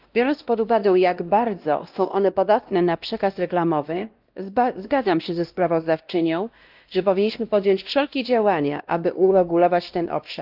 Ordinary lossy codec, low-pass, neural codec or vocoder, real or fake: Opus, 32 kbps; 5.4 kHz; codec, 16 kHz, 1 kbps, X-Codec, WavLM features, trained on Multilingual LibriSpeech; fake